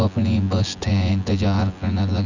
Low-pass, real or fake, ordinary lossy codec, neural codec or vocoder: 7.2 kHz; fake; none; vocoder, 24 kHz, 100 mel bands, Vocos